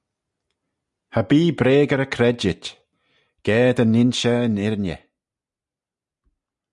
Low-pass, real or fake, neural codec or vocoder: 10.8 kHz; real; none